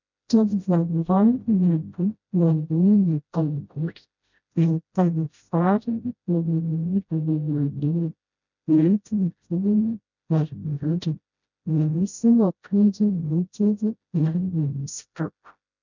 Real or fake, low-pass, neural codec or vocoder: fake; 7.2 kHz; codec, 16 kHz, 0.5 kbps, FreqCodec, smaller model